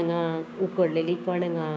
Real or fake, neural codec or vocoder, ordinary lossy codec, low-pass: fake; codec, 16 kHz, 6 kbps, DAC; none; none